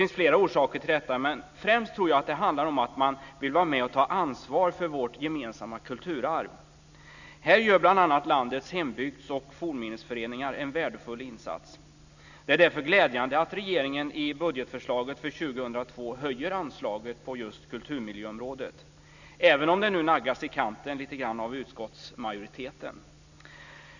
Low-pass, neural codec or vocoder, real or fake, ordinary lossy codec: 7.2 kHz; none; real; none